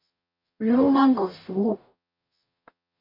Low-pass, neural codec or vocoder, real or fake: 5.4 kHz; codec, 44.1 kHz, 0.9 kbps, DAC; fake